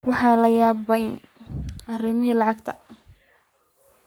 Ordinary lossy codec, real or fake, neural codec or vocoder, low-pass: none; fake; codec, 44.1 kHz, 3.4 kbps, Pupu-Codec; none